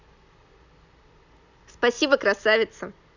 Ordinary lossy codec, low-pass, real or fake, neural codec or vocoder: none; 7.2 kHz; real; none